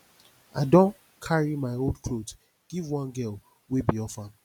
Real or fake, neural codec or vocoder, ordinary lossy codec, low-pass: real; none; none; 19.8 kHz